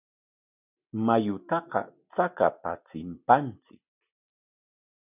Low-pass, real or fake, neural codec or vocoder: 3.6 kHz; real; none